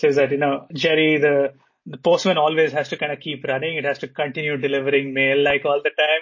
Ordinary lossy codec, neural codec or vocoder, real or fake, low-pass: MP3, 32 kbps; none; real; 7.2 kHz